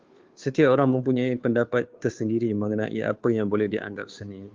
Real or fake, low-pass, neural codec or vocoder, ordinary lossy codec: fake; 7.2 kHz; codec, 16 kHz, 2 kbps, FunCodec, trained on Chinese and English, 25 frames a second; Opus, 32 kbps